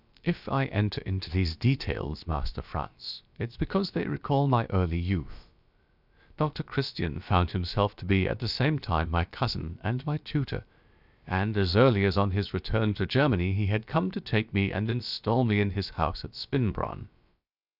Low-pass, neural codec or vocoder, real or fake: 5.4 kHz; codec, 16 kHz, about 1 kbps, DyCAST, with the encoder's durations; fake